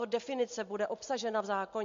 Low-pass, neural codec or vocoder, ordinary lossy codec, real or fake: 7.2 kHz; none; MP3, 48 kbps; real